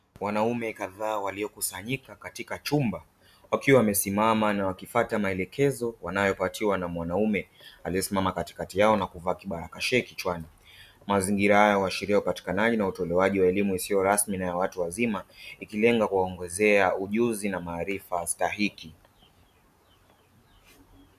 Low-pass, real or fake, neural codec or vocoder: 14.4 kHz; real; none